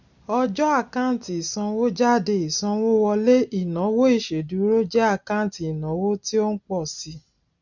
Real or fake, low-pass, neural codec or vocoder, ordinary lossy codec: real; 7.2 kHz; none; none